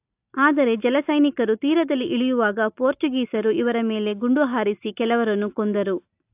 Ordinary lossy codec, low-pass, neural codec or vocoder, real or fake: AAC, 32 kbps; 3.6 kHz; none; real